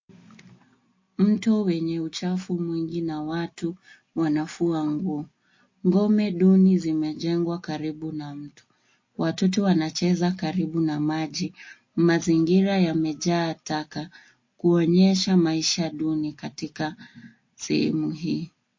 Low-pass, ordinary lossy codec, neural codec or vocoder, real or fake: 7.2 kHz; MP3, 32 kbps; none; real